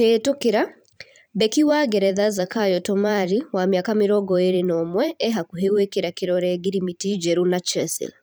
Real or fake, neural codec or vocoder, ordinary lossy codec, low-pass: fake; vocoder, 44.1 kHz, 128 mel bands every 512 samples, BigVGAN v2; none; none